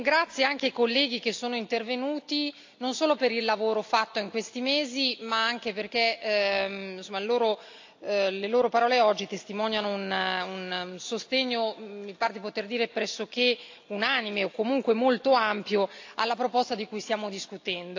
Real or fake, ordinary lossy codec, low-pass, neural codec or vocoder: real; AAC, 48 kbps; 7.2 kHz; none